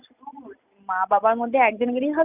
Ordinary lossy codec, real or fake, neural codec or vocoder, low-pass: none; real; none; 3.6 kHz